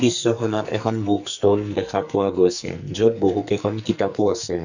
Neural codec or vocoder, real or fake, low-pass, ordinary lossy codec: codec, 44.1 kHz, 2.6 kbps, SNAC; fake; 7.2 kHz; none